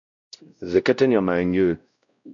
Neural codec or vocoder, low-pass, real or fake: codec, 16 kHz, 0.5 kbps, X-Codec, WavLM features, trained on Multilingual LibriSpeech; 7.2 kHz; fake